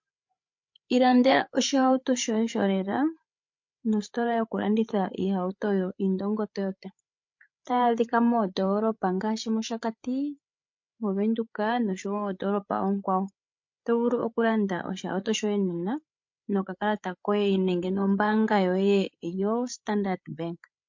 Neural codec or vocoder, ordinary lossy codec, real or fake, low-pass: codec, 16 kHz, 8 kbps, FreqCodec, larger model; MP3, 48 kbps; fake; 7.2 kHz